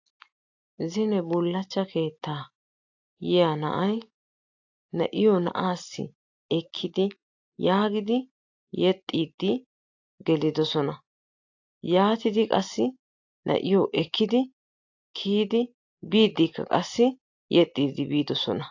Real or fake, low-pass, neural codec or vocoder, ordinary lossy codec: real; 7.2 kHz; none; AAC, 48 kbps